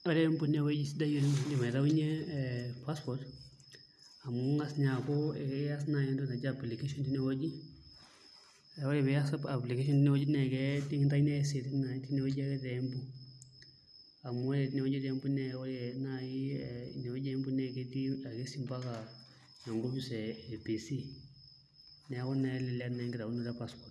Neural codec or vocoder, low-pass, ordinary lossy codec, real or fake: none; none; none; real